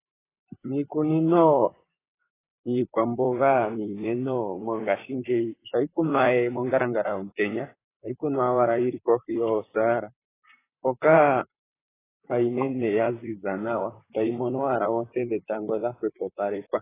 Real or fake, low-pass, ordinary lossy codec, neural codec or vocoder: fake; 3.6 kHz; AAC, 16 kbps; vocoder, 44.1 kHz, 128 mel bands, Pupu-Vocoder